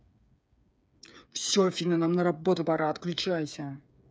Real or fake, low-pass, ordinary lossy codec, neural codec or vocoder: fake; none; none; codec, 16 kHz, 8 kbps, FreqCodec, smaller model